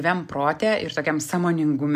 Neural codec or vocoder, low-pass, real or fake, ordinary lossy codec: none; 14.4 kHz; real; MP3, 96 kbps